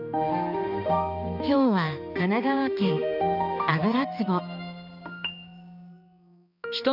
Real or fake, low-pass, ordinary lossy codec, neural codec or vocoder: fake; 5.4 kHz; none; codec, 16 kHz, 2 kbps, X-Codec, HuBERT features, trained on balanced general audio